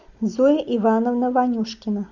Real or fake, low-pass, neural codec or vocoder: real; 7.2 kHz; none